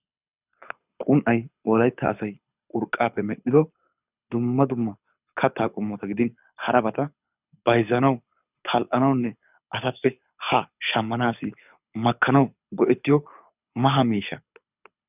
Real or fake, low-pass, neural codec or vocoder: fake; 3.6 kHz; codec, 24 kHz, 6 kbps, HILCodec